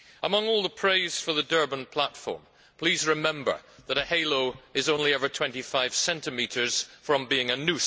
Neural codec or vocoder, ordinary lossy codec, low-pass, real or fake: none; none; none; real